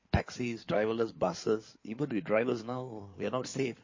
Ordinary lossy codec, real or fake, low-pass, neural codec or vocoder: MP3, 32 kbps; fake; 7.2 kHz; codec, 16 kHz in and 24 kHz out, 2.2 kbps, FireRedTTS-2 codec